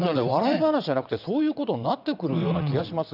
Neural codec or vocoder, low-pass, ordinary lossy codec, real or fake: vocoder, 22.05 kHz, 80 mel bands, WaveNeXt; 5.4 kHz; none; fake